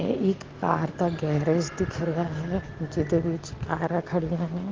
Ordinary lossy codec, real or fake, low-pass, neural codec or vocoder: Opus, 16 kbps; real; 7.2 kHz; none